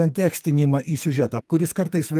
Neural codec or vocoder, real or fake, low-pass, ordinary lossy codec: codec, 44.1 kHz, 2.6 kbps, SNAC; fake; 14.4 kHz; Opus, 32 kbps